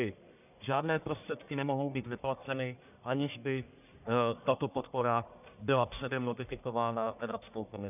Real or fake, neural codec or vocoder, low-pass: fake; codec, 44.1 kHz, 1.7 kbps, Pupu-Codec; 3.6 kHz